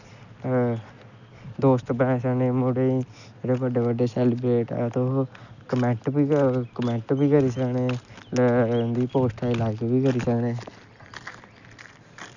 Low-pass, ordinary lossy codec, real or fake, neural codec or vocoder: 7.2 kHz; none; real; none